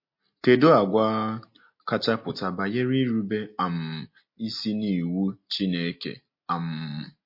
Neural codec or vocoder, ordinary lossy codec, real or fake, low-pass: none; MP3, 32 kbps; real; 5.4 kHz